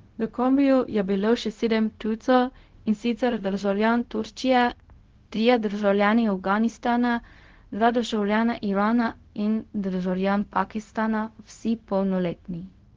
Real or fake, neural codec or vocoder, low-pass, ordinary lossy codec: fake; codec, 16 kHz, 0.4 kbps, LongCat-Audio-Codec; 7.2 kHz; Opus, 16 kbps